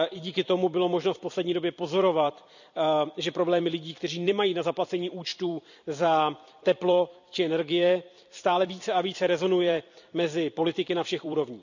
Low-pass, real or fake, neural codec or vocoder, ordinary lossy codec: 7.2 kHz; fake; vocoder, 44.1 kHz, 128 mel bands every 256 samples, BigVGAN v2; none